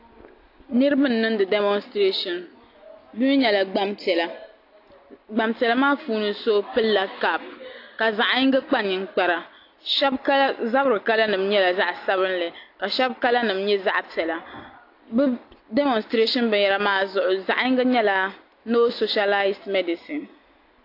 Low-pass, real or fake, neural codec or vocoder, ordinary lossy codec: 5.4 kHz; real; none; AAC, 32 kbps